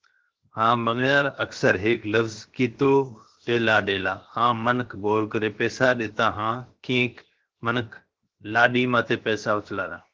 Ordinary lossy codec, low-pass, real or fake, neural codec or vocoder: Opus, 16 kbps; 7.2 kHz; fake; codec, 16 kHz, 0.7 kbps, FocalCodec